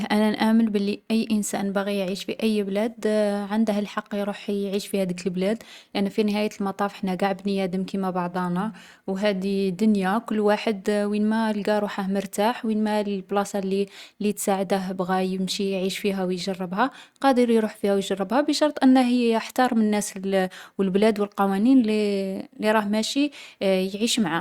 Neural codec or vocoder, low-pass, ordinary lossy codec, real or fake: none; 19.8 kHz; Opus, 64 kbps; real